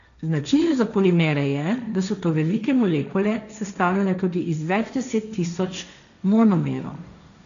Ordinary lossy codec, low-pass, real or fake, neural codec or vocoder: none; 7.2 kHz; fake; codec, 16 kHz, 1.1 kbps, Voila-Tokenizer